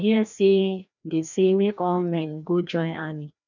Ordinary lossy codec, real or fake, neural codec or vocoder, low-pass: none; fake; codec, 16 kHz, 1 kbps, FreqCodec, larger model; 7.2 kHz